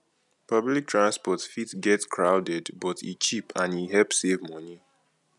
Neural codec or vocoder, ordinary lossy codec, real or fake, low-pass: none; none; real; 10.8 kHz